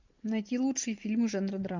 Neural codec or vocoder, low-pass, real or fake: none; 7.2 kHz; real